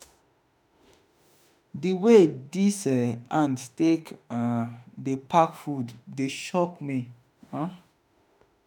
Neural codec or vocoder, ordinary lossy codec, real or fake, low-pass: autoencoder, 48 kHz, 32 numbers a frame, DAC-VAE, trained on Japanese speech; none; fake; none